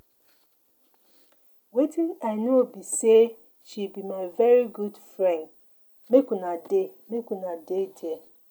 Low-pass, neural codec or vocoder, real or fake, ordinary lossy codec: 19.8 kHz; none; real; none